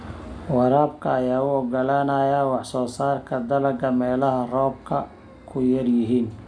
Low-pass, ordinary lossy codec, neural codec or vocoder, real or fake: 9.9 kHz; MP3, 96 kbps; none; real